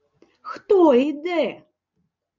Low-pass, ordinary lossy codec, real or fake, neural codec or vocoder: 7.2 kHz; Opus, 64 kbps; real; none